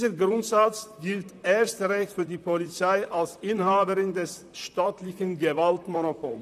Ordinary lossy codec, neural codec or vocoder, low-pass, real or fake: none; vocoder, 44.1 kHz, 128 mel bands, Pupu-Vocoder; 14.4 kHz; fake